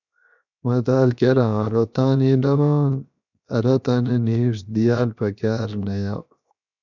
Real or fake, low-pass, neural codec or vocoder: fake; 7.2 kHz; codec, 16 kHz, 0.7 kbps, FocalCodec